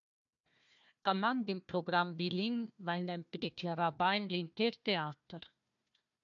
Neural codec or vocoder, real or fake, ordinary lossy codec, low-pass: codec, 16 kHz, 1 kbps, FunCodec, trained on Chinese and English, 50 frames a second; fake; AAC, 64 kbps; 7.2 kHz